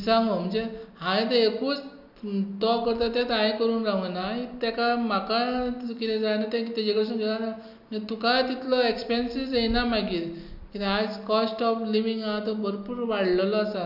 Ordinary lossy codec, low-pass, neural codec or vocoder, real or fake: none; 5.4 kHz; none; real